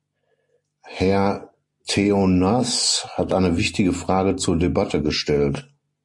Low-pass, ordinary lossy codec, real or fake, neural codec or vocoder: 10.8 kHz; MP3, 48 kbps; real; none